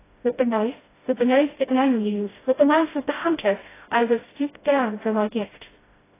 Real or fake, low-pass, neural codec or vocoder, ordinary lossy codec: fake; 3.6 kHz; codec, 16 kHz, 0.5 kbps, FreqCodec, smaller model; AAC, 16 kbps